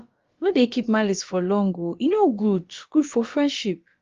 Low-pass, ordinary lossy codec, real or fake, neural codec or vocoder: 7.2 kHz; Opus, 32 kbps; fake; codec, 16 kHz, about 1 kbps, DyCAST, with the encoder's durations